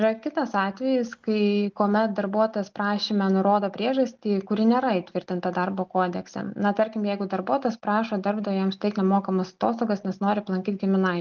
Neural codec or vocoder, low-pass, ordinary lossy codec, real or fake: none; 7.2 kHz; Opus, 32 kbps; real